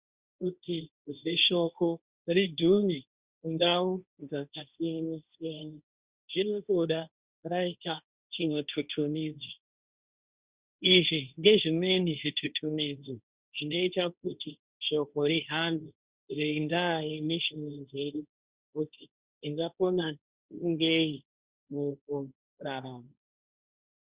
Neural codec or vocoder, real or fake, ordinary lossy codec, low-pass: codec, 16 kHz, 1.1 kbps, Voila-Tokenizer; fake; Opus, 64 kbps; 3.6 kHz